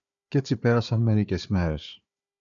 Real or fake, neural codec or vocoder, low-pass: fake; codec, 16 kHz, 4 kbps, FunCodec, trained on Chinese and English, 50 frames a second; 7.2 kHz